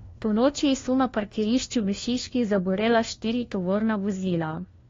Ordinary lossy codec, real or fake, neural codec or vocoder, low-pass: AAC, 32 kbps; fake; codec, 16 kHz, 1 kbps, FunCodec, trained on LibriTTS, 50 frames a second; 7.2 kHz